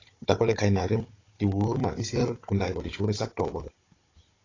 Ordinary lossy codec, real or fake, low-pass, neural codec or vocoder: AAC, 32 kbps; fake; 7.2 kHz; codec, 16 kHz, 16 kbps, FunCodec, trained on Chinese and English, 50 frames a second